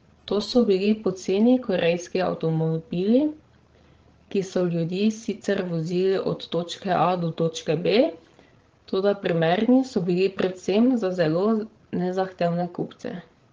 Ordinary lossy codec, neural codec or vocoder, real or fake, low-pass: Opus, 16 kbps; codec, 16 kHz, 16 kbps, FreqCodec, larger model; fake; 7.2 kHz